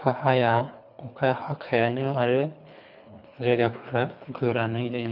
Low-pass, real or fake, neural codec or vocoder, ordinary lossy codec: 5.4 kHz; fake; codec, 24 kHz, 3 kbps, HILCodec; none